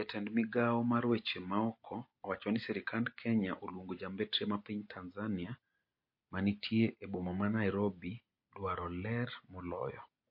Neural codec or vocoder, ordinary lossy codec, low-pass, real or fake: none; MP3, 32 kbps; 5.4 kHz; real